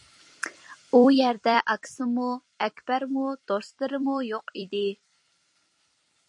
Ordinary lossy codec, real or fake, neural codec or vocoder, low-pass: MP3, 48 kbps; fake; vocoder, 44.1 kHz, 128 mel bands every 256 samples, BigVGAN v2; 10.8 kHz